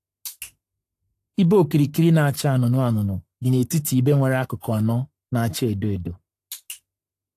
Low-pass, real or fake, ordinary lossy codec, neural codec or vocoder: 14.4 kHz; fake; AAC, 64 kbps; codec, 44.1 kHz, 7.8 kbps, Pupu-Codec